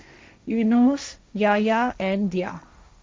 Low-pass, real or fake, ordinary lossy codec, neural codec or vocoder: none; fake; none; codec, 16 kHz, 1.1 kbps, Voila-Tokenizer